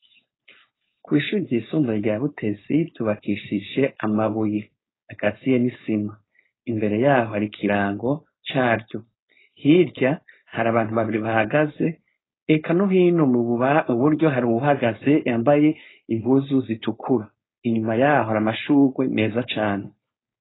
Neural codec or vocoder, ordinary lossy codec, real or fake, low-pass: codec, 16 kHz, 4.8 kbps, FACodec; AAC, 16 kbps; fake; 7.2 kHz